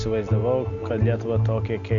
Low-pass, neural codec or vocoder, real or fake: 7.2 kHz; none; real